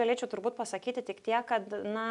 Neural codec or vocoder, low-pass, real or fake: none; 10.8 kHz; real